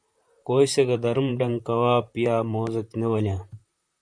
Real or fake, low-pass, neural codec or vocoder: fake; 9.9 kHz; vocoder, 44.1 kHz, 128 mel bands, Pupu-Vocoder